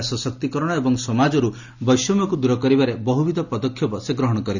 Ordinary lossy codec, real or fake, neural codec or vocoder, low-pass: none; real; none; 7.2 kHz